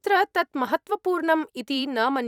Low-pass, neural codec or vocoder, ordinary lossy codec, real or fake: 19.8 kHz; vocoder, 44.1 kHz, 128 mel bands every 512 samples, BigVGAN v2; none; fake